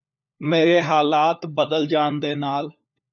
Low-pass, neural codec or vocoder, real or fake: 7.2 kHz; codec, 16 kHz, 4 kbps, FunCodec, trained on LibriTTS, 50 frames a second; fake